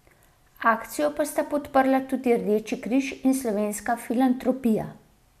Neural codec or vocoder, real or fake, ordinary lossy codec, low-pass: none; real; MP3, 96 kbps; 14.4 kHz